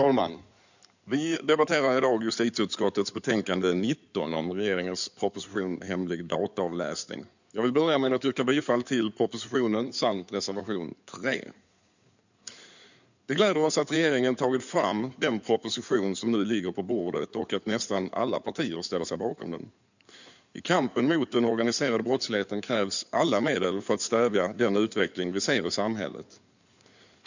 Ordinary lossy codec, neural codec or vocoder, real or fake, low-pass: none; codec, 16 kHz in and 24 kHz out, 2.2 kbps, FireRedTTS-2 codec; fake; 7.2 kHz